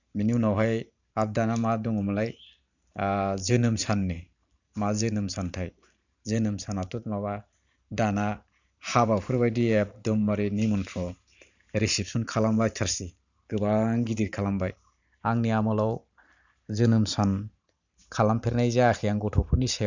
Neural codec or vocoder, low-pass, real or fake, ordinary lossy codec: vocoder, 44.1 kHz, 128 mel bands every 512 samples, BigVGAN v2; 7.2 kHz; fake; none